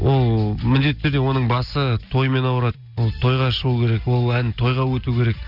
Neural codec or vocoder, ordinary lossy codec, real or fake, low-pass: none; none; real; 5.4 kHz